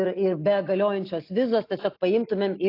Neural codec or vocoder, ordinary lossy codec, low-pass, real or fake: none; AAC, 32 kbps; 5.4 kHz; real